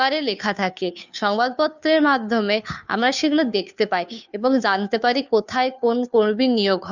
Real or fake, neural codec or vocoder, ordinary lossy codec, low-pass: fake; codec, 16 kHz, 2 kbps, FunCodec, trained on Chinese and English, 25 frames a second; none; 7.2 kHz